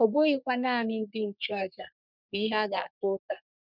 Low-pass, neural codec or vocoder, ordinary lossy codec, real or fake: 5.4 kHz; codec, 44.1 kHz, 3.4 kbps, Pupu-Codec; none; fake